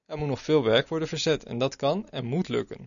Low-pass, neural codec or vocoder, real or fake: 7.2 kHz; none; real